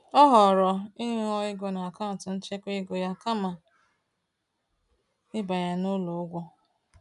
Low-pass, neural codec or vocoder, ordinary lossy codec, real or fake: 10.8 kHz; none; none; real